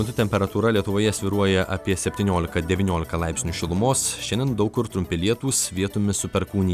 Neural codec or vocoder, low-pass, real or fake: none; 14.4 kHz; real